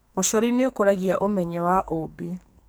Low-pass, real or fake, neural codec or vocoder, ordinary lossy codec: none; fake; codec, 44.1 kHz, 2.6 kbps, SNAC; none